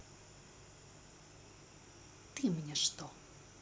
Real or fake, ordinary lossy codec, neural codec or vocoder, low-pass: real; none; none; none